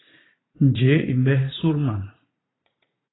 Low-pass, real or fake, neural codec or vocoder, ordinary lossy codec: 7.2 kHz; fake; vocoder, 44.1 kHz, 80 mel bands, Vocos; AAC, 16 kbps